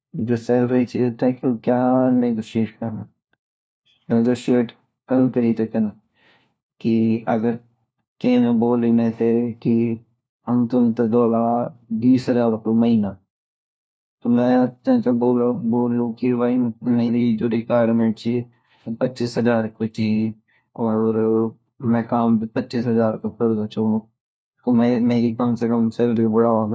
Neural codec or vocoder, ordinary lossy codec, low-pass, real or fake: codec, 16 kHz, 1 kbps, FunCodec, trained on LibriTTS, 50 frames a second; none; none; fake